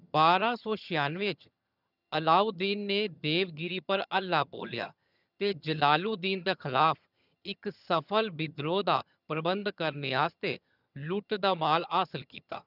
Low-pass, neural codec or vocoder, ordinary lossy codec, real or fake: 5.4 kHz; vocoder, 22.05 kHz, 80 mel bands, HiFi-GAN; none; fake